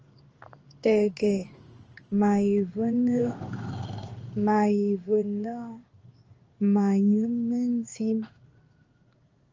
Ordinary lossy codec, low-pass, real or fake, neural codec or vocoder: Opus, 24 kbps; 7.2 kHz; fake; codec, 16 kHz in and 24 kHz out, 1 kbps, XY-Tokenizer